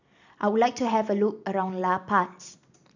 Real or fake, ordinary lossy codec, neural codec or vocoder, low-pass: real; none; none; 7.2 kHz